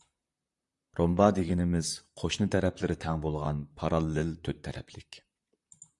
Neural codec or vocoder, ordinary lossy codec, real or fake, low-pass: vocoder, 44.1 kHz, 128 mel bands, Pupu-Vocoder; Opus, 64 kbps; fake; 10.8 kHz